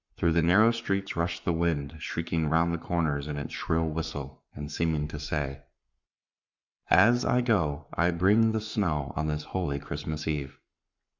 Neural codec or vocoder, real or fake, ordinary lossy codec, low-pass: codec, 44.1 kHz, 7.8 kbps, Pupu-Codec; fake; Opus, 64 kbps; 7.2 kHz